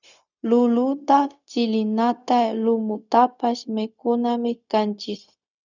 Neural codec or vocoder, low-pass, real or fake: codec, 16 kHz, 0.4 kbps, LongCat-Audio-Codec; 7.2 kHz; fake